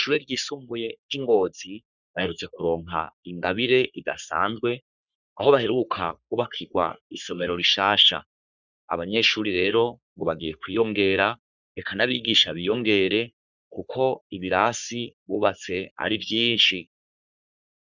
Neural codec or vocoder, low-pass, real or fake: codec, 44.1 kHz, 3.4 kbps, Pupu-Codec; 7.2 kHz; fake